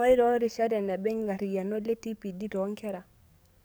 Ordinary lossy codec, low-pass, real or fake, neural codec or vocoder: none; none; fake; codec, 44.1 kHz, 7.8 kbps, DAC